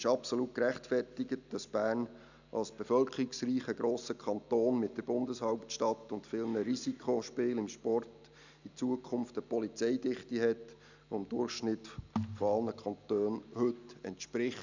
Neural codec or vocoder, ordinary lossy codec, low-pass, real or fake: none; none; 7.2 kHz; real